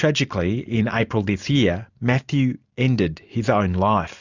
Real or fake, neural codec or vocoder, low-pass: real; none; 7.2 kHz